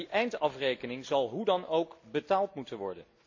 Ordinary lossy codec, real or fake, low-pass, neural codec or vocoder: AAC, 48 kbps; real; 7.2 kHz; none